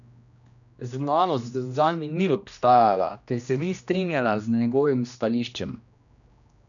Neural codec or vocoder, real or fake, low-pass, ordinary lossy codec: codec, 16 kHz, 1 kbps, X-Codec, HuBERT features, trained on general audio; fake; 7.2 kHz; none